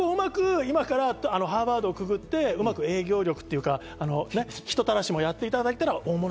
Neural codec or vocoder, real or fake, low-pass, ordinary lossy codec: none; real; none; none